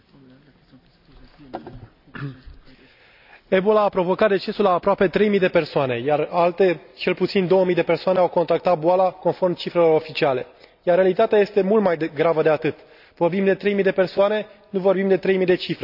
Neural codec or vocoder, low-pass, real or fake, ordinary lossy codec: none; 5.4 kHz; real; none